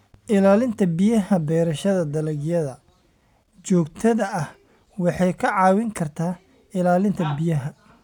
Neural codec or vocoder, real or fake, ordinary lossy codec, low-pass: none; real; none; 19.8 kHz